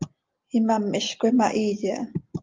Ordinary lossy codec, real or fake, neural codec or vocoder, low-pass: Opus, 24 kbps; real; none; 7.2 kHz